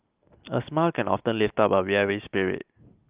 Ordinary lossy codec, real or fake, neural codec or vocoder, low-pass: Opus, 24 kbps; real; none; 3.6 kHz